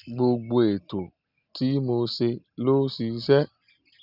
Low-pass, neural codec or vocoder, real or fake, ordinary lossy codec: 5.4 kHz; none; real; none